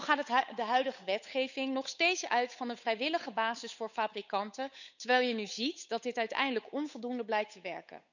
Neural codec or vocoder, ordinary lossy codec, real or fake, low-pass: codec, 16 kHz, 8 kbps, FunCodec, trained on Chinese and English, 25 frames a second; none; fake; 7.2 kHz